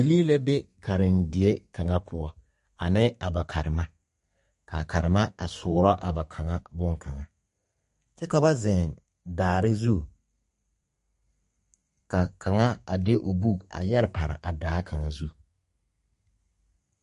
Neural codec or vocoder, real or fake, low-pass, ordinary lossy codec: codec, 32 kHz, 1.9 kbps, SNAC; fake; 14.4 kHz; MP3, 48 kbps